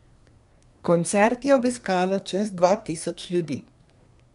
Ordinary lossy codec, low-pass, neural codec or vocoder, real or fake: none; 10.8 kHz; codec, 24 kHz, 1 kbps, SNAC; fake